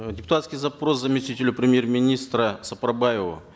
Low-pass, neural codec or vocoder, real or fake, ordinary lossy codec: none; none; real; none